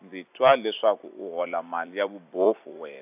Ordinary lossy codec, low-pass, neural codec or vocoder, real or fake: AAC, 32 kbps; 3.6 kHz; vocoder, 44.1 kHz, 128 mel bands every 256 samples, BigVGAN v2; fake